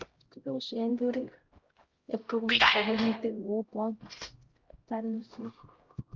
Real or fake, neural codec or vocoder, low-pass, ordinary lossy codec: fake; codec, 16 kHz, 0.5 kbps, X-Codec, HuBERT features, trained on LibriSpeech; 7.2 kHz; Opus, 32 kbps